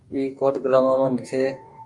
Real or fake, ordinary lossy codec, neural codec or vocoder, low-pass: fake; MP3, 64 kbps; codec, 44.1 kHz, 2.6 kbps, DAC; 10.8 kHz